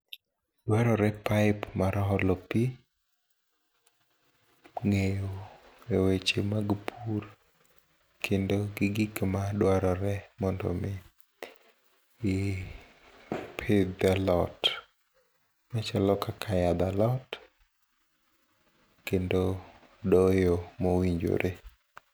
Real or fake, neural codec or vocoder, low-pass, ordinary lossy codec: real; none; none; none